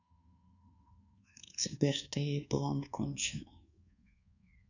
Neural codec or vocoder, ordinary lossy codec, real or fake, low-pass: codec, 24 kHz, 1.2 kbps, DualCodec; none; fake; 7.2 kHz